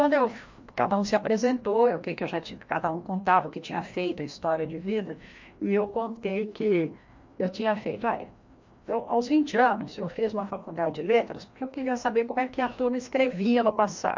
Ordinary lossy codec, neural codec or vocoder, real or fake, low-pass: MP3, 48 kbps; codec, 16 kHz, 1 kbps, FreqCodec, larger model; fake; 7.2 kHz